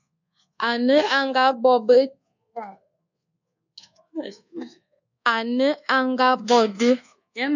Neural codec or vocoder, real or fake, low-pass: codec, 24 kHz, 1.2 kbps, DualCodec; fake; 7.2 kHz